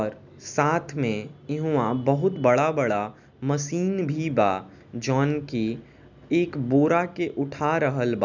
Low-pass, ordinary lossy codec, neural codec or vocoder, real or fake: 7.2 kHz; none; none; real